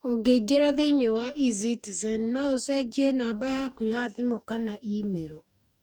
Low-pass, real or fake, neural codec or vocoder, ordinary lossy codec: 19.8 kHz; fake; codec, 44.1 kHz, 2.6 kbps, DAC; none